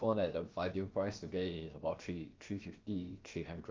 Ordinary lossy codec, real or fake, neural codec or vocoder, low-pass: Opus, 32 kbps; fake; codec, 16 kHz, about 1 kbps, DyCAST, with the encoder's durations; 7.2 kHz